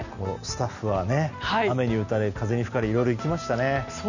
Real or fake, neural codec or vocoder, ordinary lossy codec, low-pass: real; none; none; 7.2 kHz